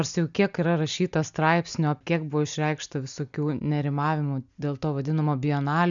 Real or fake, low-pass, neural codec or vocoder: real; 7.2 kHz; none